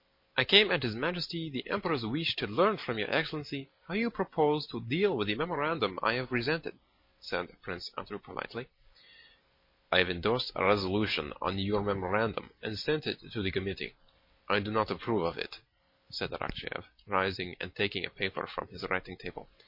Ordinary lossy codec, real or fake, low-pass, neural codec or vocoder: MP3, 32 kbps; real; 5.4 kHz; none